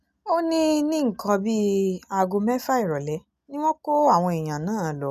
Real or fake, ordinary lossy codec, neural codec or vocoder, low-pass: real; none; none; 14.4 kHz